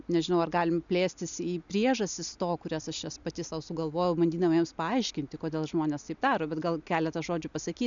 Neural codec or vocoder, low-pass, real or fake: none; 7.2 kHz; real